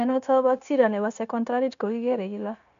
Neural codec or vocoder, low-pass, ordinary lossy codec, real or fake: codec, 16 kHz, 0.9 kbps, LongCat-Audio-Codec; 7.2 kHz; none; fake